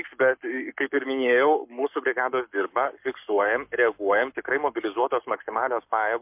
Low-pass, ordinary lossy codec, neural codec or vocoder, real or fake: 3.6 kHz; MP3, 32 kbps; codec, 44.1 kHz, 7.8 kbps, Pupu-Codec; fake